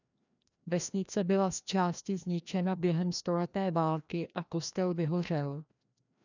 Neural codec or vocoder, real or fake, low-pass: codec, 16 kHz, 1 kbps, FreqCodec, larger model; fake; 7.2 kHz